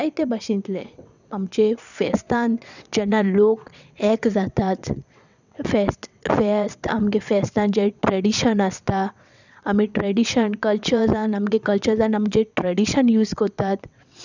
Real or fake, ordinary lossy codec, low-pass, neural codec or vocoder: fake; none; 7.2 kHz; vocoder, 22.05 kHz, 80 mel bands, WaveNeXt